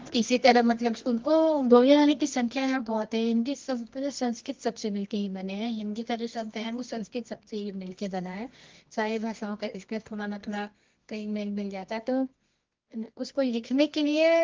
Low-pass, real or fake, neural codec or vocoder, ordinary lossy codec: 7.2 kHz; fake; codec, 24 kHz, 0.9 kbps, WavTokenizer, medium music audio release; Opus, 32 kbps